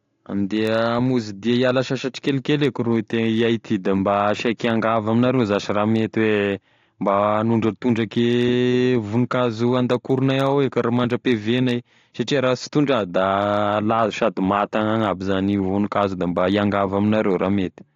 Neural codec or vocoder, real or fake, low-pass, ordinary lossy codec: none; real; 7.2 kHz; AAC, 48 kbps